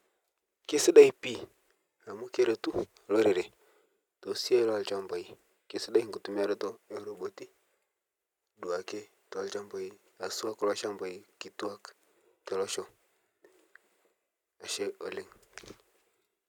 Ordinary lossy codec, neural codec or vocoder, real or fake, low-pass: none; none; real; 19.8 kHz